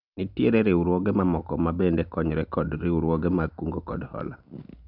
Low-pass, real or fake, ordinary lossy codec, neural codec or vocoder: 5.4 kHz; real; none; none